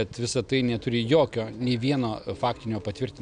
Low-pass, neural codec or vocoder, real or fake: 9.9 kHz; none; real